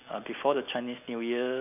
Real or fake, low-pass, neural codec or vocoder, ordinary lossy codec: real; 3.6 kHz; none; none